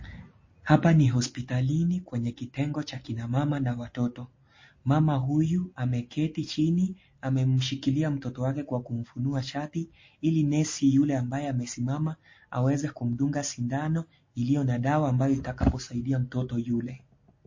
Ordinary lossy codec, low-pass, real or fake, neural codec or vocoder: MP3, 32 kbps; 7.2 kHz; real; none